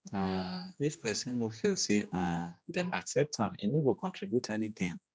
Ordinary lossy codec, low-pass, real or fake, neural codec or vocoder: none; none; fake; codec, 16 kHz, 1 kbps, X-Codec, HuBERT features, trained on general audio